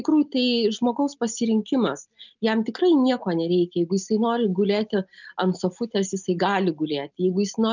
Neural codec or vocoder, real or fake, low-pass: none; real; 7.2 kHz